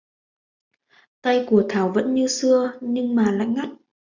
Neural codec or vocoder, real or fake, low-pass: none; real; 7.2 kHz